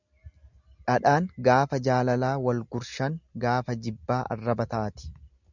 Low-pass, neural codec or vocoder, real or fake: 7.2 kHz; none; real